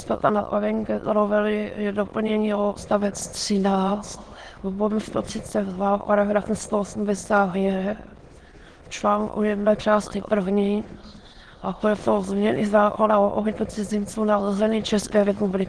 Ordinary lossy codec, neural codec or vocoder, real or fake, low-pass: Opus, 16 kbps; autoencoder, 22.05 kHz, a latent of 192 numbers a frame, VITS, trained on many speakers; fake; 9.9 kHz